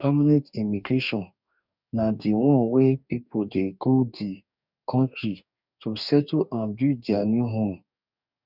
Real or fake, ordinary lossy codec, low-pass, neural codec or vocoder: fake; none; 5.4 kHz; codec, 44.1 kHz, 2.6 kbps, DAC